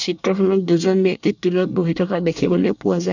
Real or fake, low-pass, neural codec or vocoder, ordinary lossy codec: fake; 7.2 kHz; codec, 24 kHz, 1 kbps, SNAC; none